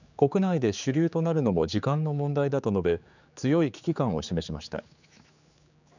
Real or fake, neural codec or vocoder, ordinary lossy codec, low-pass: fake; codec, 16 kHz, 4 kbps, X-Codec, HuBERT features, trained on general audio; none; 7.2 kHz